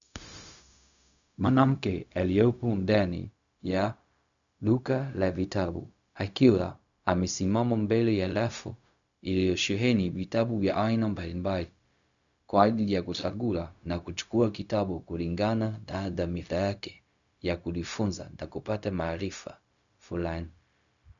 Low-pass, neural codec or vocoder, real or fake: 7.2 kHz; codec, 16 kHz, 0.4 kbps, LongCat-Audio-Codec; fake